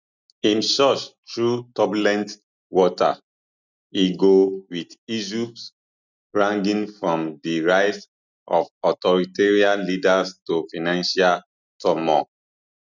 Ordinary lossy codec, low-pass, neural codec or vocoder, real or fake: none; 7.2 kHz; none; real